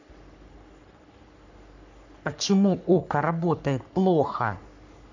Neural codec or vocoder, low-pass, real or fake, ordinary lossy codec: codec, 44.1 kHz, 3.4 kbps, Pupu-Codec; 7.2 kHz; fake; none